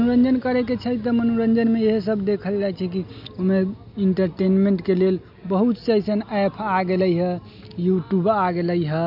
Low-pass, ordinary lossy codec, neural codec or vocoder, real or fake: 5.4 kHz; none; none; real